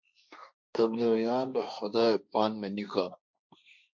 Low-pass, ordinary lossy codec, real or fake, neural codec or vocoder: 7.2 kHz; MP3, 64 kbps; fake; codec, 16 kHz, 1.1 kbps, Voila-Tokenizer